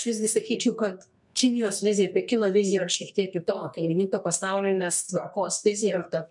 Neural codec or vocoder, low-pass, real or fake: codec, 24 kHz, 0.9 kbps, WavTokenizer, medium music audio release; 10.8 kHz; fake